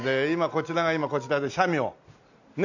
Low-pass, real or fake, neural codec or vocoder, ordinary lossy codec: 7.2 kHz; real; none; none